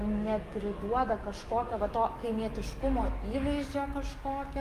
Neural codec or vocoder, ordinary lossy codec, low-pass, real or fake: codec, 44.1 kHz, 7.8 kbps, DAC; Opus, 32 kbps; 14.4 kHz; fake